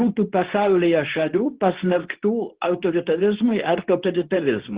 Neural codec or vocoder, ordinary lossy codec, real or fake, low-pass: codec, 24 kHz, 0.9 kbps, WavTokenizer, medium speech release version 2; Opus, 16 kbps; fake; 3.6 kHz